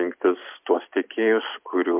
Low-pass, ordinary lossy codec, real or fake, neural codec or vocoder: 3.6 kHz; MP3, 32 kbps; real; none